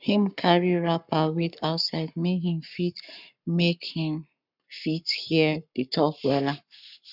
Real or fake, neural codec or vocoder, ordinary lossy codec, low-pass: fake; codec, 44.1 kHz, 7.8 kbps, Pupu-Codec; none; 5.4 kHz